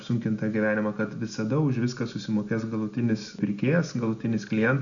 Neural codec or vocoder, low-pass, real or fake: none; 7.2 kHz; real